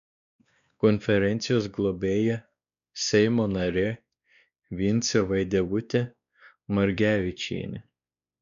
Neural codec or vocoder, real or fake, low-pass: codec, 16 kHz, 2 kbps, X-Codec, WavLM features, trained on Multilingual LibriSpeech; fake; 7.2 kHz